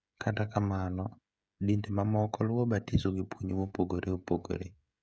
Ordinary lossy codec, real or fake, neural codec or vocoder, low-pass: none; fake; codec, 16 kHz, 16 kbps, FreqCodec, smaller model; none